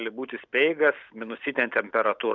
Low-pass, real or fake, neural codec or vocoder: 7.2 kHz; real; none